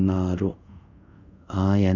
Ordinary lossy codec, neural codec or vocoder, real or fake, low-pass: none; codec, 24 kHz, 0.5 kbps, DualCodec; fake; 7.2 kHz